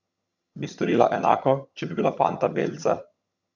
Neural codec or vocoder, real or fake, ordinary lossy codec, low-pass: vocoder, 22.05 kHz, 80 mel bands, HiFi-GAN; fake; none; 7.2 kHz